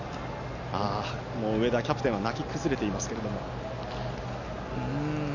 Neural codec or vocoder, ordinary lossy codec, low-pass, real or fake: none; none; 7.2 kHz; real